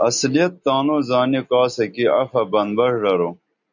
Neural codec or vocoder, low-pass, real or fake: none; 7.2 kHz; real